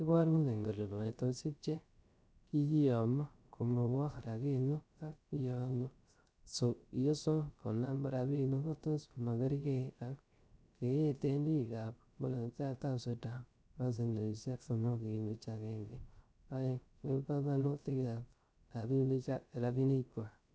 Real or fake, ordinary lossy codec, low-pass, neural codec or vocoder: fake; none; none; codec, 16 kHz, 0.3 kbps, FocalCodec